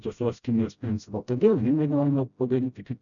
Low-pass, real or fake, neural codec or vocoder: 7.2 kHz; fake; codec, 16 kHz, 0.5 kbps, FreqCodec, smaller model